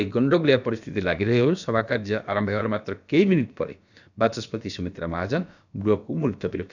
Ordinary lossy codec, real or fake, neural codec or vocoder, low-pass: none; fake; codec, 16 kHz, about 1 kbps, DyCAST, with the encoder's durations; 7.2 kHz